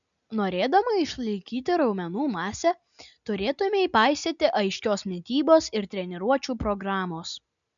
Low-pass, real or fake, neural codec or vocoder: 7.2 kHz; real; none